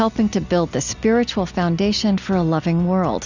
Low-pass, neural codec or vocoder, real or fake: 7.2 kHz; none; real